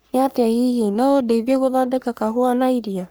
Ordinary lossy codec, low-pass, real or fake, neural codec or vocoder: none; none; fake; codec, 44.1 kHz, 3.4 kbps, Pupu-Codec